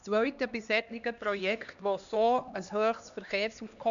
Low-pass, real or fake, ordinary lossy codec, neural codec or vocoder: 7.2 kHz; fake; none; codec, 16 kHz, 2 kbps, X-Codec, HuBERT features, trained on LibriSpeech